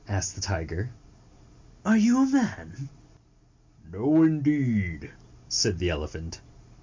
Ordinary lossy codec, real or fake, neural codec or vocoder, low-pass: MP3, 48 kbps; real; none; 7.2 kHz